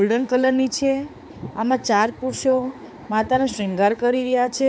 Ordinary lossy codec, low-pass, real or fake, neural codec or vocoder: none; none; fake; codec, 16 kHz, 4 kbps, X-Codec, HuBERT features, trained on balanced general audio